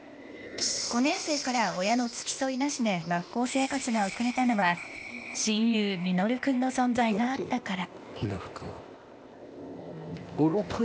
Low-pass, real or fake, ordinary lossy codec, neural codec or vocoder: none; fake; none; codec, 16 kHz, 0.8 kbps, ZipCodec